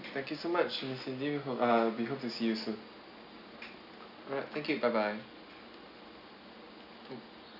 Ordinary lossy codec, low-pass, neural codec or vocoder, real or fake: none; 5.4 kHz; none; real